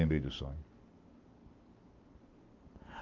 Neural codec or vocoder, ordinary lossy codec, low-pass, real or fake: none; Opus, 32 kbps; 7.2 kHz; real